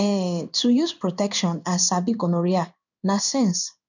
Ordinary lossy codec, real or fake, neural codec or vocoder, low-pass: none; fake; codec, 16 kHz in and 24 kHz out, 1 kbps, XY-Tokenizer; 7.2 kHz